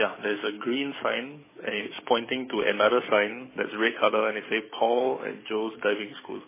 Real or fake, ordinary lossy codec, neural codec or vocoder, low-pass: fake; MP3, 16 kbps; codec, 44.1 kHz, 7.8 kbps, DAC; 3.6 kHz